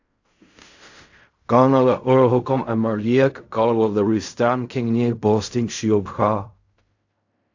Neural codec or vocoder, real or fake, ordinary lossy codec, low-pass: codec, 16 kHz in and 24 kHz out, 0.4 kbps, LongCat-Audio-Codec, fine tuned four codebook decoder; fake; AAC, 48 kbps; 7.2 kHz